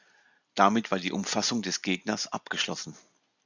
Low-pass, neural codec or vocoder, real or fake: 7.2 kHz; none; real